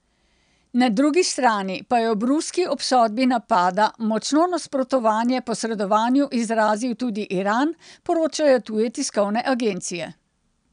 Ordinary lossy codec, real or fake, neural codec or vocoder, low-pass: none; real; none; 9.9 kHz